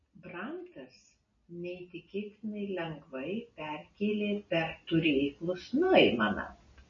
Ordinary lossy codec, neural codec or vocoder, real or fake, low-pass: MP3, 32 kbps; none; real; 7.2 kHz